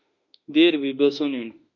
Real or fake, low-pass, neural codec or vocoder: fake; 7.2 kHz; autoencoder, 48 kHz, 32 numbers a frame, DAC-VAE, trained on Japanese speech